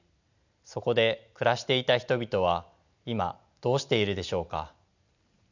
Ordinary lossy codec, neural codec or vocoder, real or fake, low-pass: none; none; real; 7.2 kHz